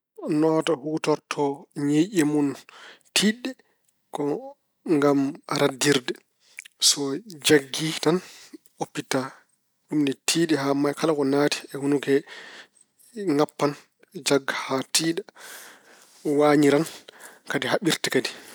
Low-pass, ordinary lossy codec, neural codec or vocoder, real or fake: none; none; none; real